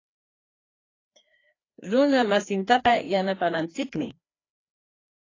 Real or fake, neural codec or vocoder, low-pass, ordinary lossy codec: fake; codec, 16 kHz, 2 kbps, FreqCodec, larger model; 7.2 kHz; AAC, 32 kbps